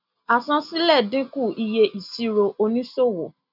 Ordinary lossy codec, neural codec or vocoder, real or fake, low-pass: none; none; real; 5.4 kHz